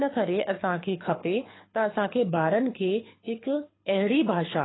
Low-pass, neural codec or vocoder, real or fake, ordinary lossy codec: 7.2 kHz; codec, 24 kHz, 6 kbps, HILCodec; fake; AAC, 16 kbps